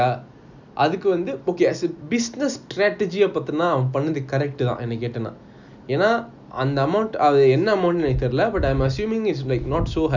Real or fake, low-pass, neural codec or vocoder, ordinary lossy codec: real; 7.2 kHz; none; none